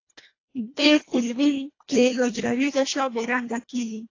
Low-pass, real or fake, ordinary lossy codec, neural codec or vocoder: 7.2 kHz; fake; AAC, 32 kbps; codec, 24 kHz, 1.5 kbps, HILCodec